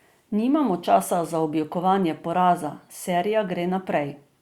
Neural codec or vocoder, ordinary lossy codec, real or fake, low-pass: none; Opus, 64 kbps; real; 19.8 kHz